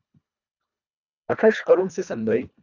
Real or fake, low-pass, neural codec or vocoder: fake; 7.2 kHz; codec, 24 kHz, 1.5 kbps, HILCodec